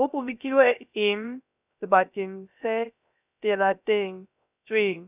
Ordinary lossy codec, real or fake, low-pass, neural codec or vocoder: none; fake; 3.6 kHz; codec, 16 kHz, 0.3 kbps, FocalCodec